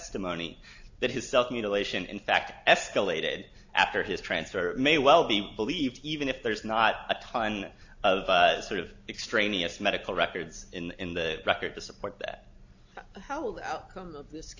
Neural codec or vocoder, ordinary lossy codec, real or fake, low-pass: none; AAC, 48 kbps; real; 7.2 kHz